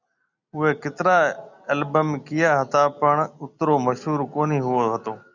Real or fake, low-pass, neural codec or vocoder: real; 7.2 kHz; none